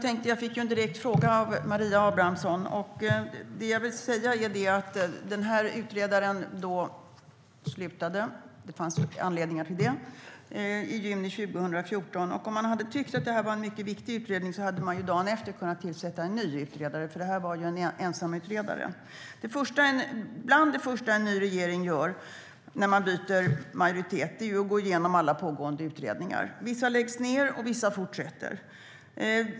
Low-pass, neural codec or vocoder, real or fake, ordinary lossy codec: none; none; real; none